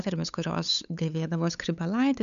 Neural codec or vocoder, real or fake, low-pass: codec, 16 kHz, 8 kbps, FunCodec, trained on LibriTTS, 25 frames a second; fake; 7.2 kHz